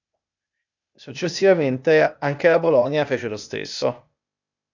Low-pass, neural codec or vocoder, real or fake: 7.2 kHz; codec, 16 kHz, 0.8 kbps, ZipCodec; fake